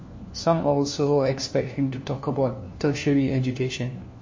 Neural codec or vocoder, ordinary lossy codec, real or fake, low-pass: codec, 16 kHz, 1 kbps, FunCodec, trained on LibriTTS, 50 frames a second; MP3, 32 kbps; fake; 7.2 kHz